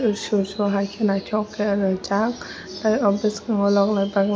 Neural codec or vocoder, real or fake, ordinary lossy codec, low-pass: none; real; none; none